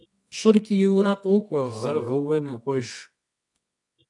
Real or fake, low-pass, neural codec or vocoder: fake; 10.8 kHz; codec, 24 kHz, 0.9 kbps, WavTokenizer, medium music audio release